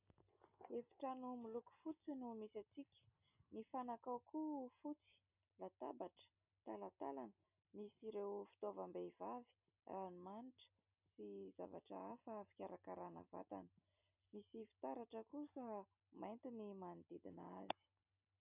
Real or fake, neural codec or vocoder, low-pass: real; none; 3.6 kHz